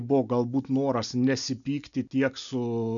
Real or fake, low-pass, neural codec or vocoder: real; 7.2 kHz; none